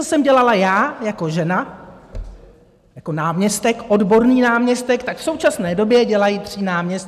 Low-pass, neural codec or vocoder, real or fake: 14.4 kHz; none; real